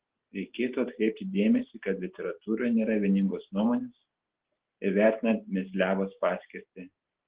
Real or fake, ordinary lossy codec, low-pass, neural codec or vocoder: real; Opus, 16 kbps; 3.6 kHz; none